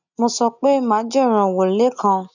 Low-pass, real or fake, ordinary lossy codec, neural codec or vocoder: 7.2 kHz; real; none; none